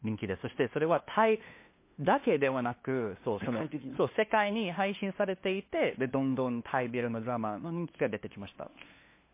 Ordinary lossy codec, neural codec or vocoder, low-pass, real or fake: MP3, 24 kbps; codec, 16 kHz, 2 kbps, FunCodec, trained on LibriTTS, 25 frames a second; 3.6 kHz; fake